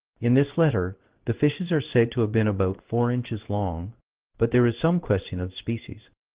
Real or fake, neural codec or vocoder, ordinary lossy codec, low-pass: fake; codec, 16 kHz in and 24 kHz out, 1 kbps, XY-Tokenizer; Opus, 16 kbps; 3.6 kHz